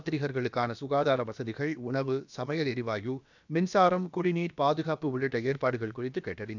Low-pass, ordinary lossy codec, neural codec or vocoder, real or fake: 7.2 kHz; none; codec, 16 kHz, about 1 kbps, DyCAST, with the encoder's durations; fake